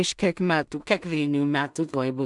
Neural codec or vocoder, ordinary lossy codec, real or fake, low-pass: codec, 16 kHz in and 24 kHz out, 0.4 kbps, LongCat-Audio-Codec, two codebook decoder; MP3, 96 kbps; fake; 10.8 kHz